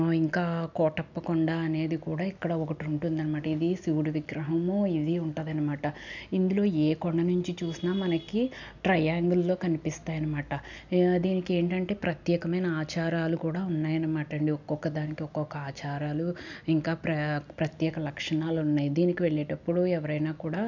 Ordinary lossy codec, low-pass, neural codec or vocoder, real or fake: none; 7.2 kHz; none; real